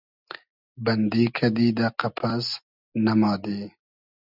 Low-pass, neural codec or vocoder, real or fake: 5.4 kHz; none; real